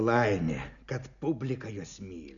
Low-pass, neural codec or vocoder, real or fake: 7.2 kHz; none; real